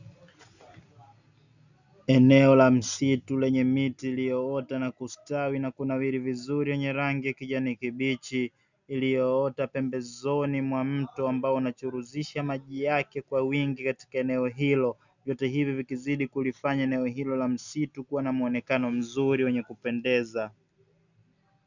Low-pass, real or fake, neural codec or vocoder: 7.2 kHz; real; none